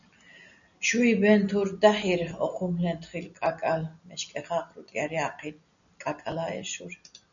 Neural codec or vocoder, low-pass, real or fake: none; 7.2 kHz; real